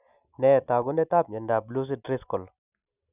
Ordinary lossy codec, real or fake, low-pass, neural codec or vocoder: none; real; 3.6 kHz; none